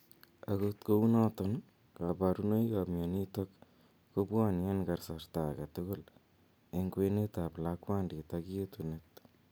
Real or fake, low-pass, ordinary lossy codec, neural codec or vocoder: real; none; none; none